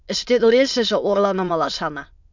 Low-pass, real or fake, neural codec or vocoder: 7.2 kHz; fake; autoencoder, 22.05 kHz, a latent of 192 numbers a frame, VITS, trained on many speakers